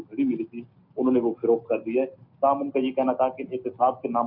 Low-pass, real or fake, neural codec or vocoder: 5.4 kHz; real; none